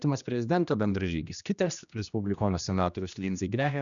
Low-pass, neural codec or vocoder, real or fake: 7.2 kHz; codec, 16 kHz, 1 kbps, X-Codec, HuBERT features, trained on general audio; fake